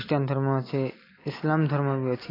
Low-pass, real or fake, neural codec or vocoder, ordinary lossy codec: 5.4 kHz; real; none; AAC, 24 kbps